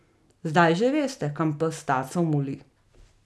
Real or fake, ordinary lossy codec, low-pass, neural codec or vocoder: real; none; none; none